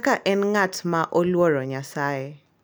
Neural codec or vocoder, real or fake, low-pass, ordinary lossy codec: none; real; none; none